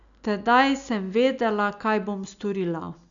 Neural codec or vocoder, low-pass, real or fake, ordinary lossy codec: none; 7.2 kHz; real; none